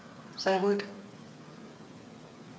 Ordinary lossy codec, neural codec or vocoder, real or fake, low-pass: none; codec, 16 kHz, 8 kbps, FreqCodec, smaller model; fake; none